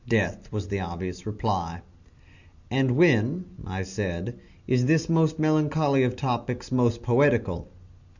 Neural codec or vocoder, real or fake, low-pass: none; real; 7.2 kHz